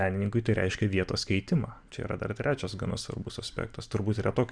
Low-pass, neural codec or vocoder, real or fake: 9.9 kHz; none; real